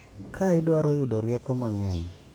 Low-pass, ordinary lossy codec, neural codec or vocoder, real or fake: none; none; codec, 44.1 kHz, 2.6 kbps, DAC; fake